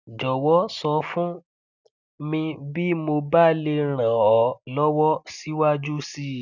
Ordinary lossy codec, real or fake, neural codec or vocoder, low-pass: none; real; none; 7.2 kHz